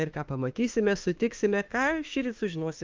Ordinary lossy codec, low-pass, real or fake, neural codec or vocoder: Opus, 32 kbps; 7.2 kHz; fake; autoencoder, 48 kHz, 32 numbers a frame, DAC-VAE, trained on Japanese speech